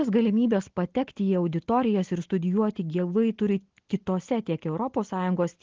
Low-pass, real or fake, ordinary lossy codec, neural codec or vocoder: 7.2 kHz; real; Opus, 16 kbps; none